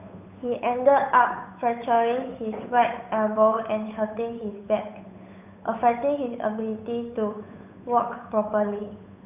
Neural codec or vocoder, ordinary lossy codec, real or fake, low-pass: codec, 16 kHz, 8 kbps, FunCodec, trained on Chinese and English, 25 frames a second; none; fake; 3.6 kHz